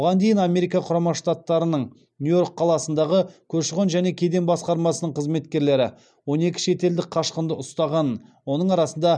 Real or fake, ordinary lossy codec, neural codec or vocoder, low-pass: real; none; none; 9.9 kHz